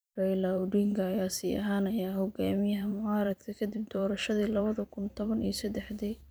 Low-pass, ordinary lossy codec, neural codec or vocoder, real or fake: none; none; vocoder, 44.1 kHz, 128 mel bands every 512 samples, BigVGAN v2; fake